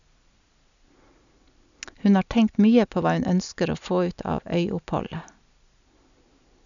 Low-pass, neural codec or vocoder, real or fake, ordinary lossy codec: 7.2 kHz; none; real; none